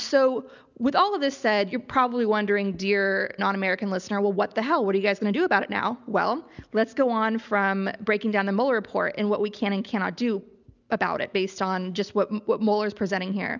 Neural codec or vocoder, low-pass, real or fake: none; 7.2 kHz; real